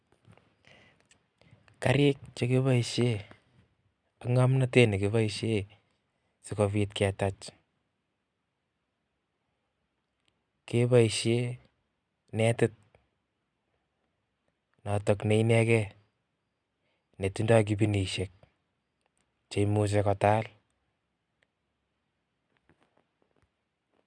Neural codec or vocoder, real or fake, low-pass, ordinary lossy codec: none; real; 9.9 kHz; none